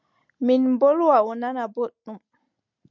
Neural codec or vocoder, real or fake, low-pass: none; real; 7.2 kHz